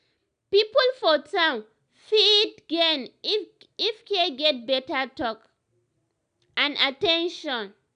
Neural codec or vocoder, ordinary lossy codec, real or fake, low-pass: none; none; real; 9.9 kHz